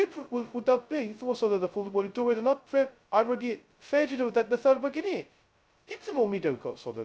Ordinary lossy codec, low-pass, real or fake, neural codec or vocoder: none; none; fake; codec, 16 kHz, 0.2 kbps, FocalCodec